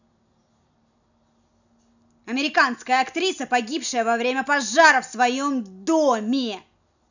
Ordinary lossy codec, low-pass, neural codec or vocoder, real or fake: none; 7.2 kHz; none; real